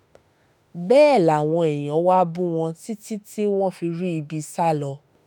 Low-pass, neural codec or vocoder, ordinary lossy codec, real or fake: none; autoencoder, 48 kHz, 32 numbers a frame, DAC-VAE, trained on Japanese speech; none; fake